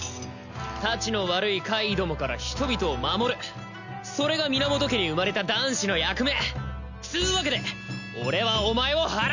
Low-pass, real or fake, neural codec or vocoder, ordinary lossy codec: 7.2 kHz; real; none; none